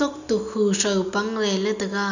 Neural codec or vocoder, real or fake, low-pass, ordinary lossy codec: none; real; 7.2 kHz; none